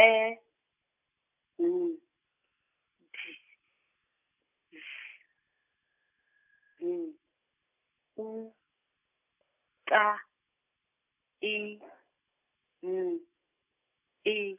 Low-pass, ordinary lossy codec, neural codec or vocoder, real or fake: 3.6 kHz; none; none; real